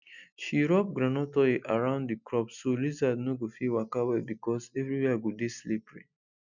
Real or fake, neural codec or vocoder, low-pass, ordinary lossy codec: real; none; 7.2 kHz; none